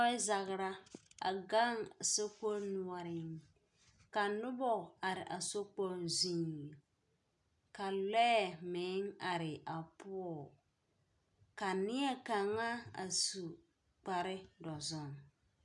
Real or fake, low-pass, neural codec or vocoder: real; 10.8 kHz; none